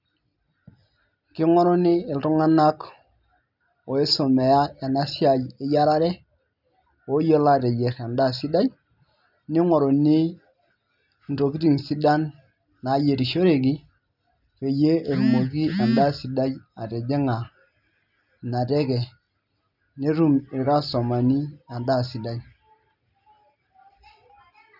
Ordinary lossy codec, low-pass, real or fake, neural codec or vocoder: none; 5.4 kHz; real; none